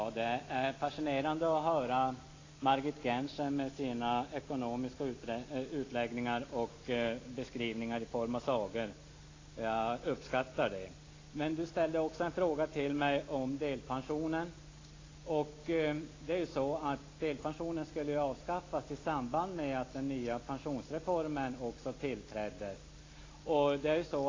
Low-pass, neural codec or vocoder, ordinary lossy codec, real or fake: 7.2 kHz; none; AAC, 32 kbps; real